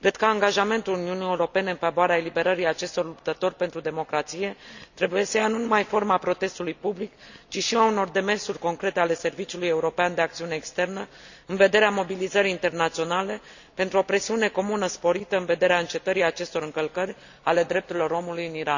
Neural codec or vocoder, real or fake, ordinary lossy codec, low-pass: none; real; none; 7.2 kHz